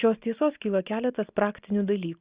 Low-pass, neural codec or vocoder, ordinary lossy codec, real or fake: 3.6 kHz; none; Opus, 64 kbps; real